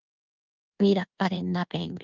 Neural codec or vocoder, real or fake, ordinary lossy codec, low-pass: codec, 24 kHz, 1.2 kbps, DualCodec; fake; Opus, 24 kbps; 7.2 kHz